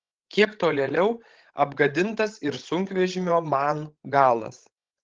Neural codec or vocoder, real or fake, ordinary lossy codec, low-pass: codec, 16 kHz, 16 kbps, FreqCodec, larger model; fake; Opus, 16 kbps; 7.2 kHz